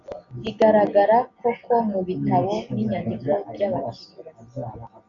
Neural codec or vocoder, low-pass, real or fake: none; 7.2 kHz; real